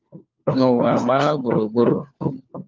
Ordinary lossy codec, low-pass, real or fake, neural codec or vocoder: Opus, 24 kbps; 7.2 kHz; fake; codec, 16 kHz, 4 kbps, FunCodec, trained on LibriTTS, 50 frames a second